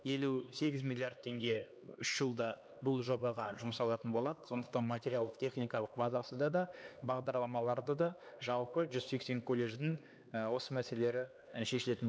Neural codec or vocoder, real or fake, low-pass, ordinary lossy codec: codec, 16 kHz, 2 kbps, X-Codec, HuBERT features, trained on LibriSpeech; fake; none; none